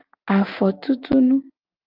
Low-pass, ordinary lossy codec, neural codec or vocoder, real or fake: 5.4 kHz; Opus, 24 kbps; none; real